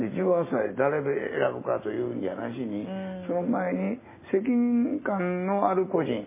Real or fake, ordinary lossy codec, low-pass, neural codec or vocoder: real; MP3, 16 kbps; 3.6 kHz; none